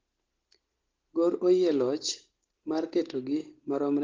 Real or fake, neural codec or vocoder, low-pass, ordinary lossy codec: real; none; 7.2 kHz; Opus, 16 kbps